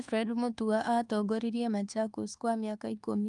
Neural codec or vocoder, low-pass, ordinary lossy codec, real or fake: codec, 24 kHz, 1.2 kbps, DualCodec; 10.8 kHz; Opus, 32 kbps; fake